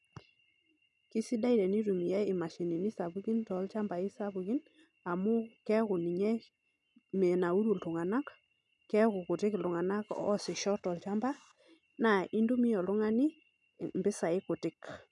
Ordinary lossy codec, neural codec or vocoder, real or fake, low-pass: none; none; real; 10.8 kHz